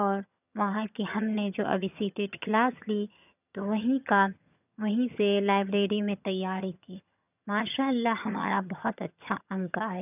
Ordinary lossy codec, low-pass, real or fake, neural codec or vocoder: none; 3.6 kHz; fake; vocoder, 22.05 kHz, 80 mel bands, HiFi-GAN